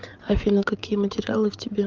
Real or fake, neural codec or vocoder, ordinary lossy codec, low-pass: fake; codec, 16 kHz, 8 kbps, FreqCodec, larger model; Opus, 32 kbps; 7.2 kHz